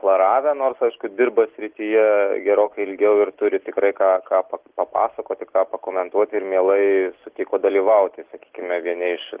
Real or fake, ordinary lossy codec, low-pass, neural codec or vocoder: real; Opus, 16 kbps; 3.6 kHz; none